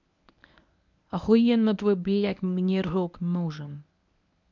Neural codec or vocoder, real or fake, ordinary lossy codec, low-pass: codec, 24 kHz, 0.9 kbps, WavTokenizer, medium speech release version 1; fake; none; 7.2 kHz